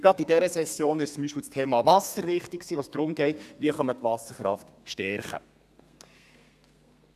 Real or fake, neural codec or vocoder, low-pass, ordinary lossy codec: fake; codec, 32 kHz, 1.9 kbps, SNAC; 14.4 kHz; none